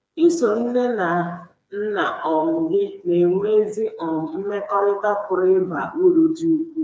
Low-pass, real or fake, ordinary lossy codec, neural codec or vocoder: none; fake; none; codec, 16 kHz, 4 kbps, FreqCodec, smaller model